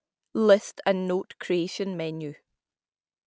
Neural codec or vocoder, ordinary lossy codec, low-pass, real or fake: none; none; none; real